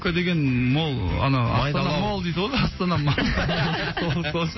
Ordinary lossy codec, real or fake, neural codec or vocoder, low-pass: MP3, 24 kbps; real; none; 7.2 kHz